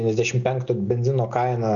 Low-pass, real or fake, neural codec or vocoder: 7.2 kHz; real; none